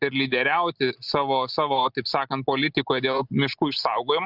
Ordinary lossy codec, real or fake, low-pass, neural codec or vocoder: Opus, 64 kbps; real; 5.4 kHz; none